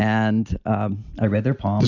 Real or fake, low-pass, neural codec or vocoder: real; 7.2 kHz; none